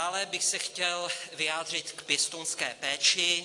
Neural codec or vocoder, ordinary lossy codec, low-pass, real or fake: none; AAC, 48 kbps; 10.8 kHz; real